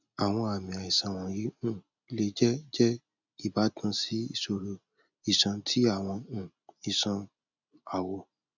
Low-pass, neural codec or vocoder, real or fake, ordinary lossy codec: 7.2 kHz; none; real; none